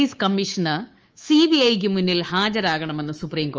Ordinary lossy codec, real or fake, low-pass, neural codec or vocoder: Opus, 24 kbps; fake; 7.2 kHz; codec, 24 kHz, 3.1 kbps, DualCodec